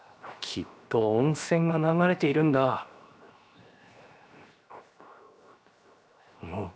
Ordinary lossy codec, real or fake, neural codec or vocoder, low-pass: none; fake; codec, 16 kHz, 0.7 kbps, FocalCodec; none